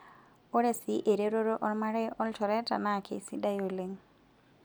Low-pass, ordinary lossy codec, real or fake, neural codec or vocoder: none; none; real; none